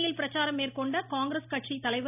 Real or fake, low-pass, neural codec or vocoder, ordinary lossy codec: real; 3.6 kHz; none; none